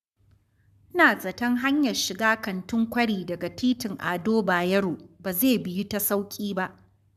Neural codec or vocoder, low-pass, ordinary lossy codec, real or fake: codec, 44.1 kHz, 7.8 kbps, Pupu-Codec; 14.4 kHz; none; fake